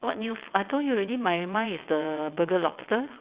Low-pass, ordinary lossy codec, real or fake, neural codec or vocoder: 3.6 kHz; Opus, 64 kbps; fake; vocoder, 22.05 kHz, 80 mel bands, WaveNeXt